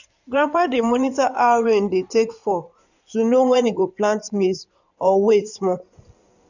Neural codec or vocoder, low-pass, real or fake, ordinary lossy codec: codec, 16 kHz in and 24 kHz out, 2.2 kbps, FireRedTTS-2 codec; 7.2 kHz; fake; none